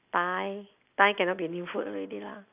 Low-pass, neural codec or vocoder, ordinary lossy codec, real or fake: 3.6 kHz; none; none; real